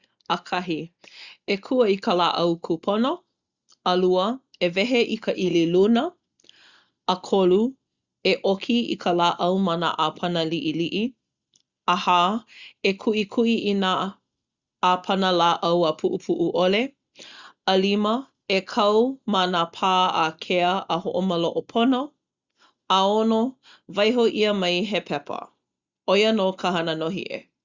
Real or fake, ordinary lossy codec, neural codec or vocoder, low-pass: real; Opus, 64 kbps; none; 7.2 kHz